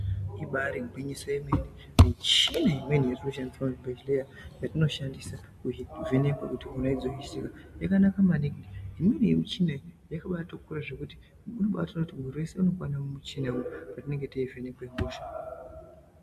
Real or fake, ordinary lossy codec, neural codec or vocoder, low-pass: real; AAC, 96 kbps; none; 14.4 kHz